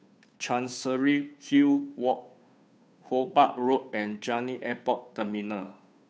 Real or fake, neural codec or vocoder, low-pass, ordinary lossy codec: fake; codec, 16 kHz, 2 kbps, FunCodec, trained on Chinese and English, 25 frames a second; none; none